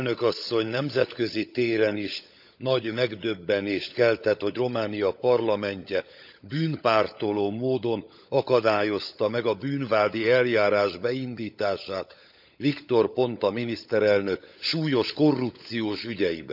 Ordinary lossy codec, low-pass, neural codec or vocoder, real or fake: none; 5.4 kHz; codec, 16 kHz, 16 kbps, FunCodec, trained on LibriTTS, 50 frames a second; fake